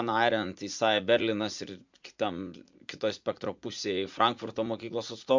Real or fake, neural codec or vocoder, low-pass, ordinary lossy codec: fake; vocoder, 44.1 kHz, 80 mel bands, Vocos; 7.2 kHz; MP3, 64 kbps